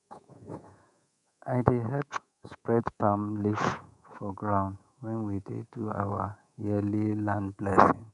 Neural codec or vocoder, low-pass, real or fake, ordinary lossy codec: codec, 24 kHz, 3.1 kbps, DualCodec; 10.8 kHz; fake; none